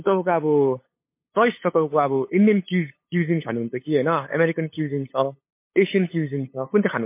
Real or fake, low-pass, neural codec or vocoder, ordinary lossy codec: fake; 3.6 kHz; codec, 16 kHz, 8 kbps, FunCodec, trained on LibriTTS, 25 frames a second; MP3, 24 kbps